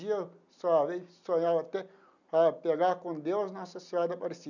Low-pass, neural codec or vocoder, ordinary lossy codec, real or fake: 7.2 kHz; none; none; real